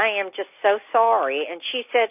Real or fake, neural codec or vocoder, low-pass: real; none; 3.6 kHz